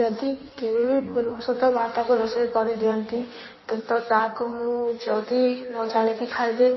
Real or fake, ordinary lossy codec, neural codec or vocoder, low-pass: fake; MP3, 24 kbps; codec, 16 kHz in and 24 kHz out, 1.1 kbps, FireRedTTS-2 codec; 7.2 kHz